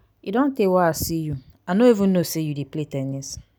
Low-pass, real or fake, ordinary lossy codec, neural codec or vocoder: none; real; none; none